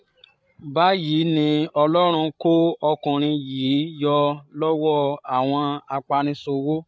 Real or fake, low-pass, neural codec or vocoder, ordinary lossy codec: fake; none; codec, 16 kHz, 8 kbps, FreqCodec, larger model; none